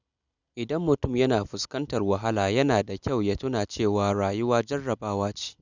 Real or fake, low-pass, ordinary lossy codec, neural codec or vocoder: real; 7.2 kHz; none; none